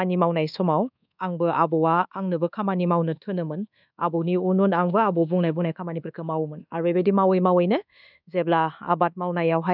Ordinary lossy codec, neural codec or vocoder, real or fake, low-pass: none; codec, 24 kHz, 1.2 kbps, DualCodec; fake; 5.4 kHz